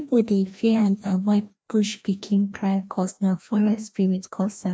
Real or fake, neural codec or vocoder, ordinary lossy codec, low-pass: fake; codec, 16 kHz, 1 kbps, FreqCodec, larger model; none; none